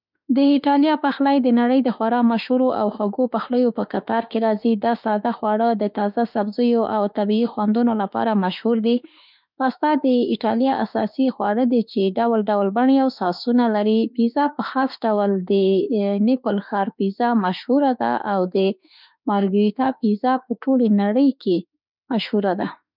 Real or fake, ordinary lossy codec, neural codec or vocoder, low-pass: fake; none; autoencoder, 48 kHz, 32 numbers a frame, DAC-VAE, trained on Japanese speech; 5.4 kHz